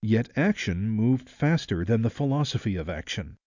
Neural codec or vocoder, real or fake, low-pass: none; real; 7.2 kHz